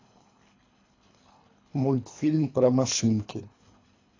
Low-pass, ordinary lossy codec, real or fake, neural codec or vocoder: 7.2 kHz; AAC, 32 kbps; fake; codec, 24 kHz, 3 kbps, HILCodec